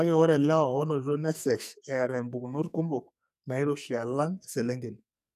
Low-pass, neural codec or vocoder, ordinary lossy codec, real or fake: 14.4 kHz; codec, 44.1 kHz, 2.6 kbps, SNAC; none; fake